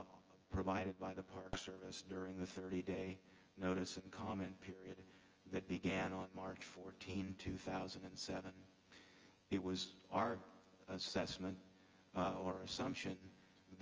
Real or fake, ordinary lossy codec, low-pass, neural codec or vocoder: fake; Opus, 24 kbps; 7.2 kHz; vocoder, 24 kHz, 100 mel bands, Vocos